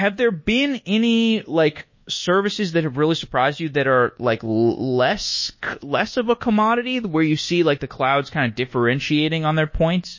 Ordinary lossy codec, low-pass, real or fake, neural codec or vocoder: MP3, 32 kbps; 7.2 kHz; fake; codec, 24 kHz, 1.2 kbps, DualCodec